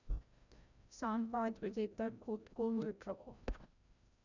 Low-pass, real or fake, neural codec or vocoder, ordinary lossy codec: 7.2 kHz; fake; codec, 16 kHz, 0.5 kbps, FreqCodec, larger model; none